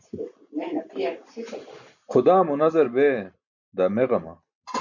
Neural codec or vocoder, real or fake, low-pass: none; real; 7.2 kHz